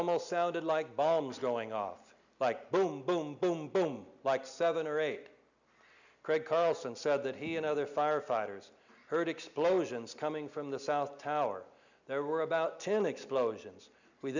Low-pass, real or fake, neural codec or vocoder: 7.2 kHz; real; none